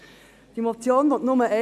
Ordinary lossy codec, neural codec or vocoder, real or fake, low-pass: none; none; real; 14.4 kHz